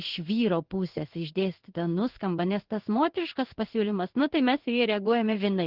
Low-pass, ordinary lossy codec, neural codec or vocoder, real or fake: 5.4 kHz; Opus, 16 kbps; codec, 16 kHz in and 24 kHz out, 1 kbps, XY-Tokenizer; fake